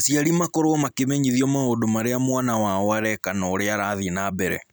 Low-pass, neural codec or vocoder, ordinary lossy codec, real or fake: none; none; none; real